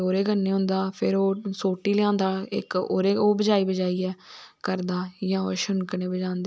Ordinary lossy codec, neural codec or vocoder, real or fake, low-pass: none; none; real; none